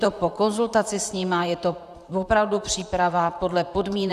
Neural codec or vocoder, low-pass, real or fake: vocoder, 44.1 kHz, 128 mel bands, Pupu-Vocoder; 14.4 kHz; fake